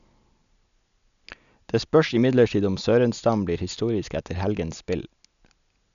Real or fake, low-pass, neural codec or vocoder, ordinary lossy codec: real; 7.2 kHz; none; none